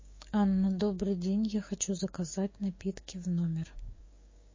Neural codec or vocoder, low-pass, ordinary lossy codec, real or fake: codec, 16 kHz, 6 kbps, DAC; 7.2 kHz; MP3, 32 kbps; fake